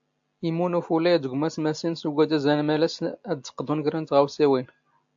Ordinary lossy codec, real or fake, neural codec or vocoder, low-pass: AAC, 64 kbps; real; none; 7.2 kHz